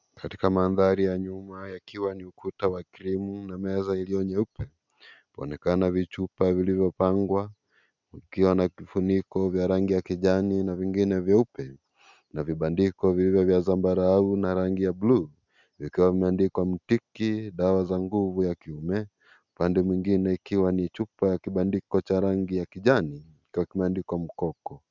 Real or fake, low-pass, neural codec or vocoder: real; 7.2 kHz; none